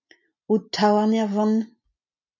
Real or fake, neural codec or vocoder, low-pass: real; none; 7.2 kHz